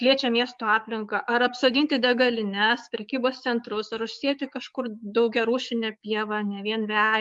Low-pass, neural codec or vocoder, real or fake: 10.8 kHz; codec, 44.1 kHz, 7.8 kbps, DAC; fake